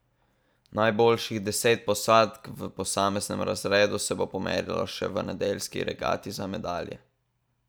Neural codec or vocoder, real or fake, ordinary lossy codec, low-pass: none; real; none; none